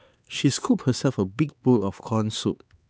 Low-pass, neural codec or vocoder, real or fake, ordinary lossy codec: none; codec, 16 kHz, 4 kbps, X-Codec, HuBERT features, trained on LibriSpeech; fake; none